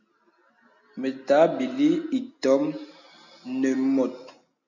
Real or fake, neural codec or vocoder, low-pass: real; none; 7.2 kHz